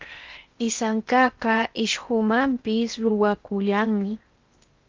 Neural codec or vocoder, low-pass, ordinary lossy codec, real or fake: codec, 16 kHz in and 24 kHz out, 0.8 kbps, FocalCodec, streaming, 65536 codes; 7.2 kHz; Opus, 24 kbps; fake